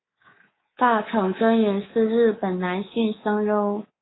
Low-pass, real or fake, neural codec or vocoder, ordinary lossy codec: 7.2 kHz; fake; codec, 24 kHz, 3.1 kbps, DualCodec; AAC, 16 kbps